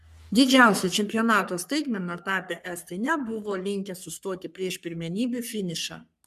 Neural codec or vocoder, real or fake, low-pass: codec, 44.1 kHz, 3.4 kbps, Pupu-Codec; fake; 14.4 kHz